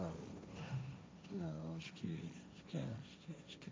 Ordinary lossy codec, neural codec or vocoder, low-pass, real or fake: none; codec, 16 kHz, 1.1 kbps, Voila-Tokenizer; none; fake